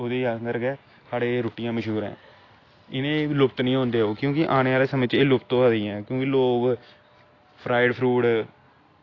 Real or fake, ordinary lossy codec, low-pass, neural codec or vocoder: real; AAC, 32 kbps; 7.2 kHz; none